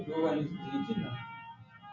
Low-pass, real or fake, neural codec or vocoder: 7.2 kHz; real; none